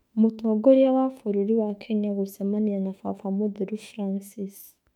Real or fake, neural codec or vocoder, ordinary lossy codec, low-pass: fake; autoencoder, 48 kHz, 32 numbers a frame, DAC-VAE, trained on Japanese speech; MP3, 96 kbps; 19.8 kHz